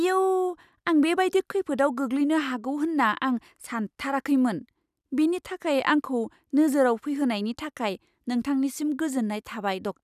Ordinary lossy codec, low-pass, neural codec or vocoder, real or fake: none; 14.4 kHz; none; real